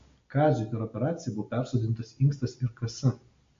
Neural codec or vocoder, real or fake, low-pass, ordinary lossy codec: none; real; 7.2 kHz; MP3, 48 kbps